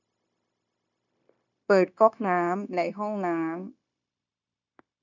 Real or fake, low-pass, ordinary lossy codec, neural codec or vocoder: fake; 7.2 kHz; none; codec, 16 kHz, 0.9 kbps, LongCat-Audio-Codec